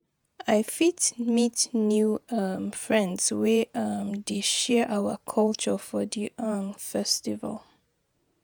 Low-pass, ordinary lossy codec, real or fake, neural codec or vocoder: none; none; fake; vocoder, 48 kHz, 128 mel bands, Vocos